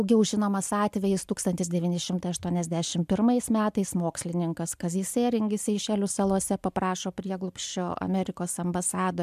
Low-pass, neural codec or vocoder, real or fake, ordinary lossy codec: 14.4 kHz; none; real; MP3, 96 kbps